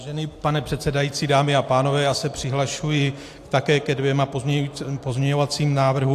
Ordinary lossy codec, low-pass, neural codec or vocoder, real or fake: AAC, 64 kbps; 14.4 kHz; none; real